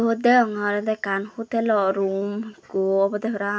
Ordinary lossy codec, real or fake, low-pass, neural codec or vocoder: none; real; none; none